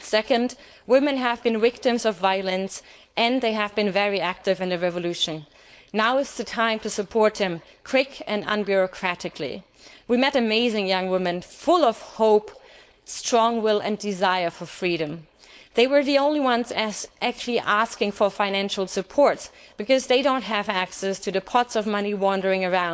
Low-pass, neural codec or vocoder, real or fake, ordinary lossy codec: none; codec, 16 kHz, 4.8 kbps, FACodec; fake; none